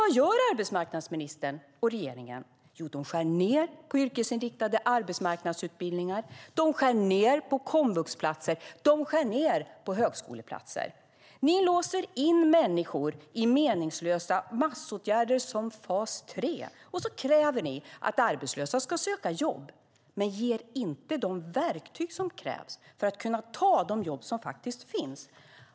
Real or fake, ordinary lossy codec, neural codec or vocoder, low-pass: real; none; none; none